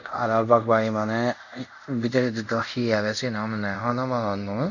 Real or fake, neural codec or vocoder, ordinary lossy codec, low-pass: fake; codec, 24 kHz, 0.5 kbps, DualCodec; none; 7.2 kHz